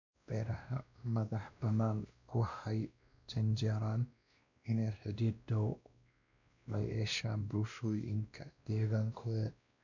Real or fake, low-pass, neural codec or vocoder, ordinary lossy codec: fake; 7.2 kHz; codec, 16 kHz, 1 kbps, X-Codec, WavLM features, trained on Multilingual LibriSpeech; none